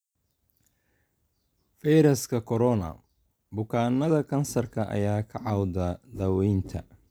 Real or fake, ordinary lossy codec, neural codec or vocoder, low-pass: real; none; none; none